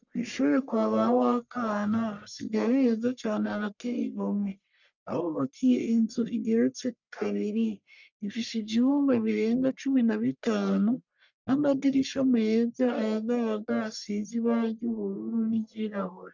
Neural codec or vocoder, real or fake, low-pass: codec, 44.1 kHz, 1.7 kbps, Pupu-Codec; fake; 7.2 kHz